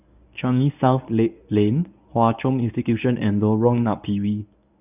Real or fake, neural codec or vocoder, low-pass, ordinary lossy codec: fake; codec, 24 kHz, 0.9 kbps, WavTokenizer, medium speech release version 1; 3.6 kHz; none